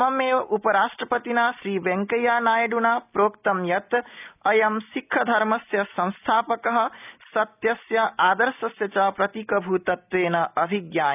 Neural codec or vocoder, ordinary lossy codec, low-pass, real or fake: none; none; 3.6 kHz; real